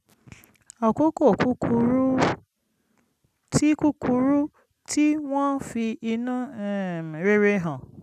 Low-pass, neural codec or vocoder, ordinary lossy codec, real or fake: 14.4 kHz; none; none; real